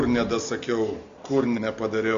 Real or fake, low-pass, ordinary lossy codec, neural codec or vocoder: real; 7.2 kHz; MP3, 48 kbps; none